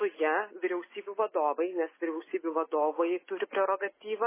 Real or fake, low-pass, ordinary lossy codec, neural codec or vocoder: real; 3.6 kHz; MP3, 16 kbps; none